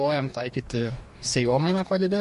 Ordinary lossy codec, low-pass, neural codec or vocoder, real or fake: MP3, 48 kbps; 14.4 kHz; codec, 44.1 kHz, 2.6 kbps, DAC; fake